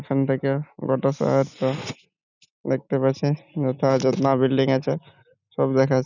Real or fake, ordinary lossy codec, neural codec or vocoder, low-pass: real; none; none; 7.2 kHz